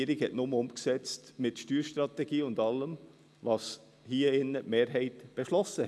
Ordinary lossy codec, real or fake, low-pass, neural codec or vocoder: none; real; none; none